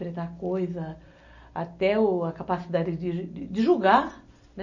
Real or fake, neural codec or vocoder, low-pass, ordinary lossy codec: real; none; 7.2 kHz; none